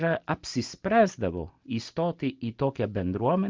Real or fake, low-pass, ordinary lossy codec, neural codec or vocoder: real; 7.2 kHz; Opus, 24 kbps; none